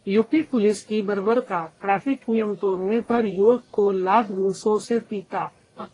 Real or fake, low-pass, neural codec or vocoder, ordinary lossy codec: fake; 10.8 kHz; codec, 44.1 kHz, 1.7 kbps, Pupu-Codec; AAC, 32 kbps